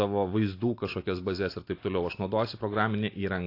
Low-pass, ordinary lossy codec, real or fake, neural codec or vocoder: 5.4 kHz; AAC, 32 kbps; real; none